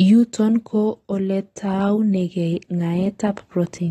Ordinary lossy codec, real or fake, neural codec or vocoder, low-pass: AAC, 32 kbps; real; none; 19.8 kHz